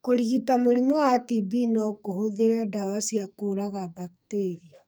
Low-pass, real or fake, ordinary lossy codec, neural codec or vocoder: none; fake; none; codec, 44.1 kHz, 2.6 kbps, SNAC